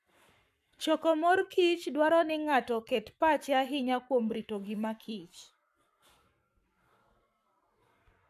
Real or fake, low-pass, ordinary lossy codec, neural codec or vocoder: fake; 14.4 kHz; none; codec, 44.1 kHz, 7.8 kbps, Pupu-Codec